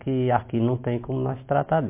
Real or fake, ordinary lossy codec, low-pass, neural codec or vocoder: real; MP3, 24 kbps; 3.6 kHz; none